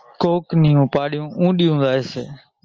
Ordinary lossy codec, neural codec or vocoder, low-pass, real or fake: Opus, 24 kbps; none; 7.2 kHz; real